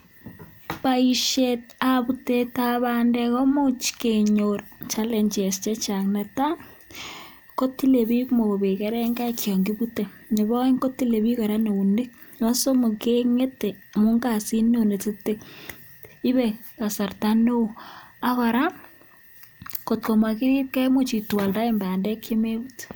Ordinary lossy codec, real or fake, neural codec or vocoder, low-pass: none; real; none; none